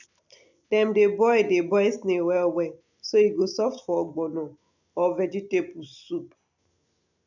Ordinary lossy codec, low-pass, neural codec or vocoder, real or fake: none; 7.2 kHz; none; real